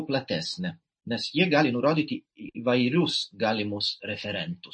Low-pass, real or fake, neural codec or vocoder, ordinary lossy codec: 9.9 kHz; real; none; MP3, 32 kbps